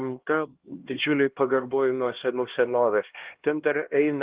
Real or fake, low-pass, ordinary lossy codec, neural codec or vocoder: fake; 3.6 kHz; Opus, 16 kbps; codec, 16 kHz, 1 kbps, X-Codec, HuBERT features, trained on LibriSpeech